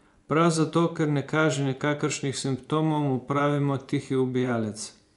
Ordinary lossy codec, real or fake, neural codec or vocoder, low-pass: none; fake; vocoder, 24 kHz, 100 mel bands, Vocos; 10.8 kHz